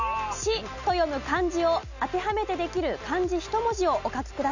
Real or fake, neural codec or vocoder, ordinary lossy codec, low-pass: real; none; none; 7.2 kHz